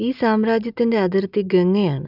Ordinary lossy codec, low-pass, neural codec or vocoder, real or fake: none; 5.4 kHz; none; real